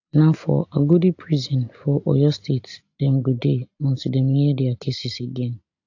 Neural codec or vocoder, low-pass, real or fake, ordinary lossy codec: none; 7.2 kHz; real; AAC, 48 kbps